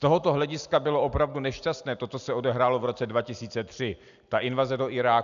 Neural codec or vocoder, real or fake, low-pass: none; real; 7.2 kHz